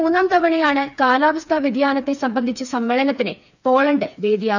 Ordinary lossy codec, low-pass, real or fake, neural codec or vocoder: none; 7.2 kHz; fake; codec, 16 kHz, 4 kbps, FreqCodec, smaller model